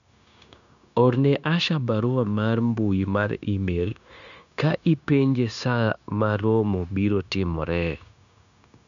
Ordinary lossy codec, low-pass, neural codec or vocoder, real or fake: none; 7.2 kHz; codec, 16 kHz, 0.9 kbps, LongCat-Audio-Codec; fake